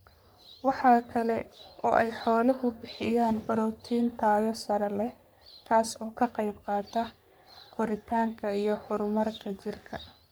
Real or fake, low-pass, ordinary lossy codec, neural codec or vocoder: fake; none; none; codec, 44.1 kHz, 3.4 kbps, Pupu-Codec